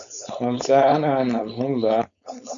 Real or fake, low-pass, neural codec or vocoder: fake; 7.2 kHz; codec, 16 kHz, 4.8 kbps, FACodec